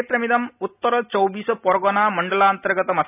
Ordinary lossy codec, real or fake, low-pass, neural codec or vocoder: none; real; 3.6 kHz; none